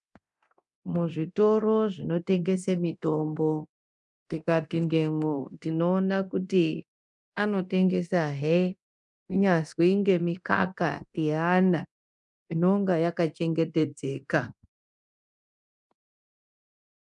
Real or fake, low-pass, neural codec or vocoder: fake; 10.8 kHz; codec, 24 kHz, 0.9 kbps, DualCodec